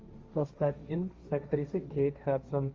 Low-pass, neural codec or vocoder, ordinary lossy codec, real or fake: 7.2 kHz; codec, 16 kHz, 1.1 kbps, Voila-Tokenizer; Opus, 32 kbps; fake